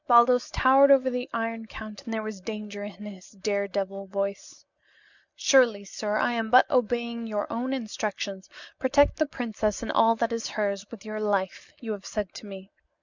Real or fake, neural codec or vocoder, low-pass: real; none; 7.2 kHz